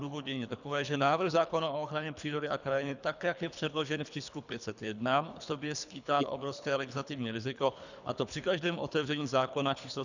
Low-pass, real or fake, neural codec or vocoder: 7.2 kHz; fake; codec, 24 kHz, 3 kbps, HILCodec